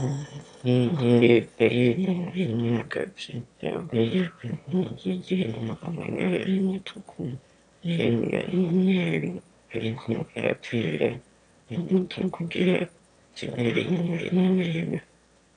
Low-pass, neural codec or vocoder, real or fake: 9.9 kHz; autoencoder, 22.05 kHz, a latent of 192 numbers a frame, VITS, trained on one speaker; fake